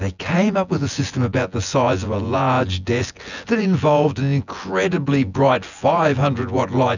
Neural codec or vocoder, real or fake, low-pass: vocoder, 24 kHz, 100 mel bands, Vocos; fake; 7.2 kHz